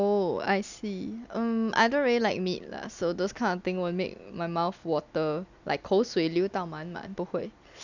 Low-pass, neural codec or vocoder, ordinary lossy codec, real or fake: 7.2 kHz; none; none; real